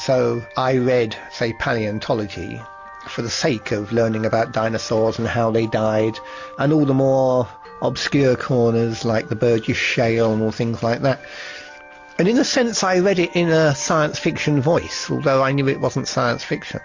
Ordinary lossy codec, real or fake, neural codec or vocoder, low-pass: MP3, 48 kbps; real; none; 7.2 kHz